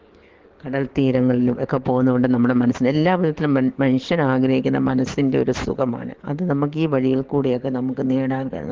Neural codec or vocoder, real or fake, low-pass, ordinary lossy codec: codec, 44.1 kHz, 7.8 kbps, DAC; fake; 7.2 kHz; Opus, 16 kbps